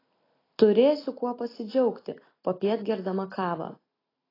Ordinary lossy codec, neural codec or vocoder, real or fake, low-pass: AAC, 24 kbps; none; real; 5.4 kHz